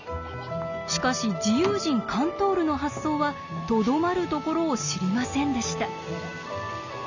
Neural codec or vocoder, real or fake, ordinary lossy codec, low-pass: none; real; none; 7.2 kHz